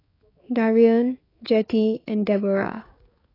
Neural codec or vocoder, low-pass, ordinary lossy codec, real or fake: codec, 16 kHz, 4 kbps, X-Codec, HuBERT features, trained on balanced general audio; 5.4 kHz; AAC, 24 kbps; fake